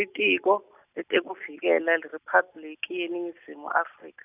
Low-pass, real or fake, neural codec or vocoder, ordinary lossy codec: 3.6 kHz; real; none; none